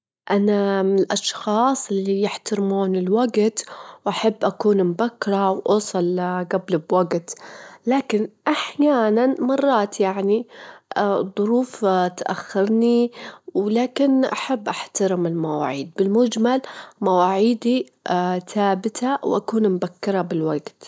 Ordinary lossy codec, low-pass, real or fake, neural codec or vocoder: none; none; real; none